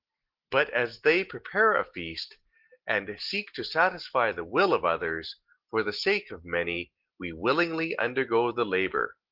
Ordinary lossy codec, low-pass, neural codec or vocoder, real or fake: Opus, 16 kbps; 5.4 kHz; none; real